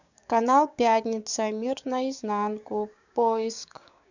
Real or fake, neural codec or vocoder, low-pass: fake; codec, 16 kHz, 6 kbps, DAC; 7.2 kHz